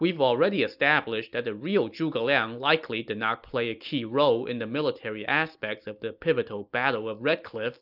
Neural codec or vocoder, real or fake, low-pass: none; real; 5.4 kHz